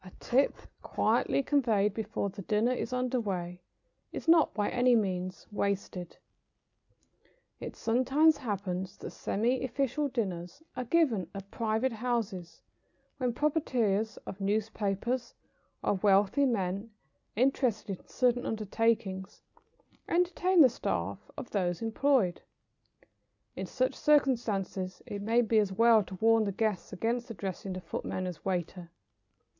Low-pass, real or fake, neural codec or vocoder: 7.2 kHz; real; none